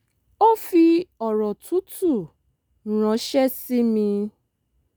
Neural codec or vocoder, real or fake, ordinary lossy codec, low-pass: none; real; none; none